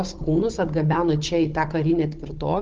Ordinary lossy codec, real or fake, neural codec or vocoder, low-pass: Opus, 16 kbps; fake; codec, 16 kHz, 16 kbps, FunCodec, trained on Chinese and English, 50 frames a second; 7.2 kHz